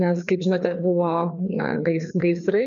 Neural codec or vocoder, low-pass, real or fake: codec, 16 kHz, 2 kbps, FreqCodec, larger model; 7.2 kHz; fake